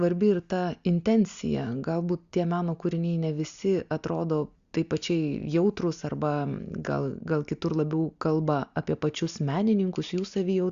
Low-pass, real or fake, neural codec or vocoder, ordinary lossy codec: 7.2 kHz; real; none; Opus, 64 kbps